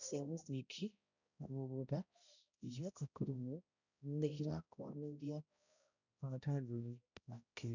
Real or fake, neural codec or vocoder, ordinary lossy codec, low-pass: fake; codec, 16 kHz, 0.5 kbps, X-Codec, HuBERT features, trained on balanced general audio; none; 7.2 kHz